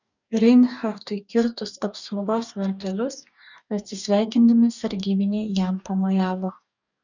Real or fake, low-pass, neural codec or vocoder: fake; 7.2 kHz; codec, 44.1 kHz, 2.6 kbps, DAC